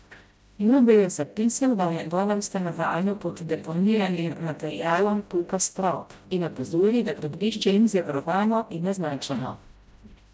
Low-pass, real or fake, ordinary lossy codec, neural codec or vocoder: none; fake; none; codec, 16 kHz, 0.5 kbps, FreqCodec, smaller model